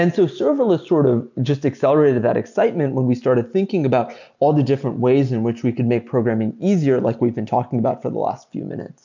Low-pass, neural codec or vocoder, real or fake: 7.2 kHz; none; real